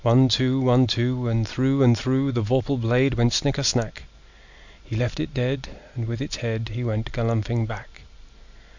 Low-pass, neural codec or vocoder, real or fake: 7.2 kHz; none; real